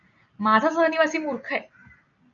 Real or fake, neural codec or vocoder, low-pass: real; none; 7.2 kHz